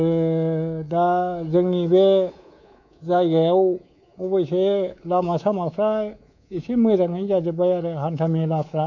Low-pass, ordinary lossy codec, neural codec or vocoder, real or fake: 7.2 kHz; none; codec, 24 kHz, 3.1 kbps, DualCodec; fake